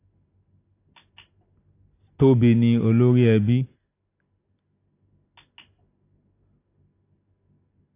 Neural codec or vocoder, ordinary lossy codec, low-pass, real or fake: none; AAC, 24 kbps; 3.6 kHz; real